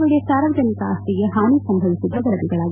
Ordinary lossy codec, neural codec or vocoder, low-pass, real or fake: none; none; 3.6 kHz; real